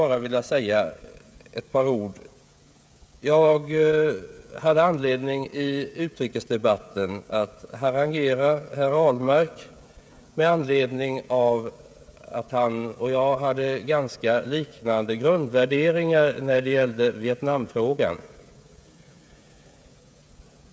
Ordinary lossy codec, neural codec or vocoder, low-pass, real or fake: none; codec, 16 kHz, 16 kbps, FreqCodec, smaller model; none; fake